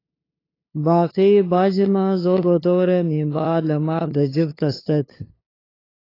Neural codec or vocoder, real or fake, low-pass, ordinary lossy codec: codec, 16 kHz, 2 kbps, FunCodec, trained on LibriTTS, 25 frames a second; fake; 5.4 kHz; AAC, 24 kbps